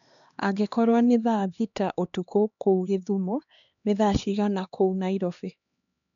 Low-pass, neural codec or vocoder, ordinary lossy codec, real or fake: 7.2 kHz; codec, 16 kHz, 2 kbps, X-Codec, HuBERT features, trained on LibriSpeech; none; fake